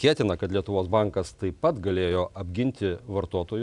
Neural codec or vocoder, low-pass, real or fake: none; 10.8 kHz; real